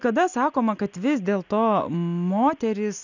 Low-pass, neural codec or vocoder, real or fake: 7.2 kHz; none; real